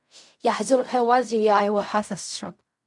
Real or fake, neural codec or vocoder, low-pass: fake; codec, 16 kHz in and 24 kHz out, 0.4 kbps, LongCat-Audio-Codec, fine tuned four codebook decoder; 10.8 kHz